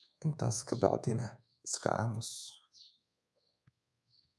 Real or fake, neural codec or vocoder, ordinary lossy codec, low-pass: fake; codec, 24 kHz, 1.2 kbps, DualCodec; none; none